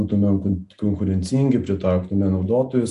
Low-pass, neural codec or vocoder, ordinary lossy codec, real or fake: 10.8 kHz; none; MP3, 64 kbps; real